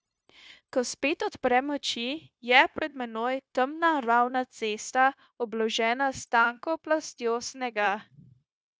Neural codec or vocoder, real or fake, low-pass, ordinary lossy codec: codec, 16 kHz, 0.9 kbps, LongCat-Audio-Codec; fake; none; none